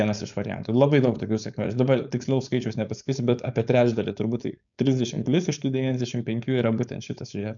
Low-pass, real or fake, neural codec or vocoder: 7.2 kHz; fake; codec, 16 kHz, 4.8 kbps, FACodec